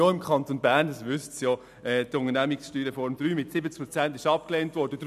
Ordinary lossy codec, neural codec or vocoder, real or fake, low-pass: none; none; real; 14.4 kHz